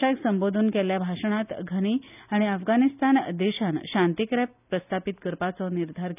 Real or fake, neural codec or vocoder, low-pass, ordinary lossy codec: real; none; 3.6 kHz; none